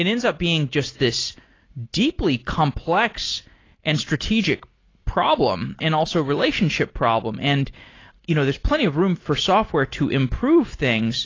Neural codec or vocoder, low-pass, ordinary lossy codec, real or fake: none; 7.2 kHz; AAC, 32 kbps; real